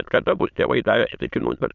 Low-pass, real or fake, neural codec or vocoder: 7.2 kHz; fake; autoencoder, 22.05 kHz, a latent of 192 numbers a frame, VITS, trained on many speakers